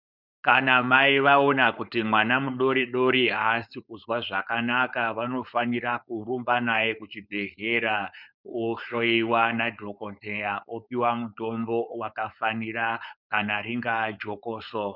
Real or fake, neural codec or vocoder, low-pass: fake; codec, 16 kHz, 4.8 kbps, FACodec; 5.4 kHz